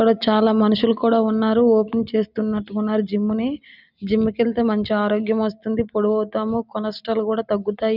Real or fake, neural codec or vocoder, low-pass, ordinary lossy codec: real; none; 5.4 kHz; none